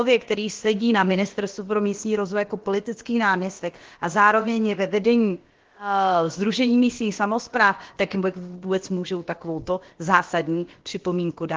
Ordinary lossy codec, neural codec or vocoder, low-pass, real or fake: Opus, 16 kbps; codec, 16 kHz, about 1 kbps, DyCAST, with the encoder's durations; 7.2 kHz; fake